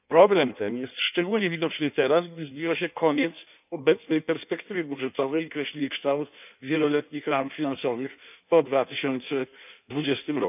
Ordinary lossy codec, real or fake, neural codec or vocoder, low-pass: none; fake; codec, 16 kHz in and 24 kHz out, 1.1 kbps, FireRedTTS-2 codec; 3.6 kHz